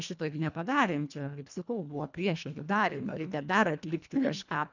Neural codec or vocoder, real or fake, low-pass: codec, 24 kHz, 1.5 kbps, HILCodec; fake; 7.2 kHz